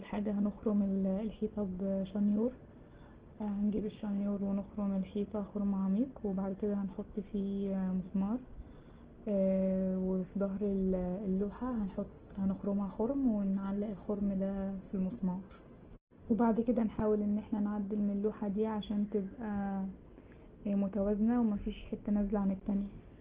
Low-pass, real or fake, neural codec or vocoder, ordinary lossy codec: 3.6 kHz; real; none; Opus, 16 kbps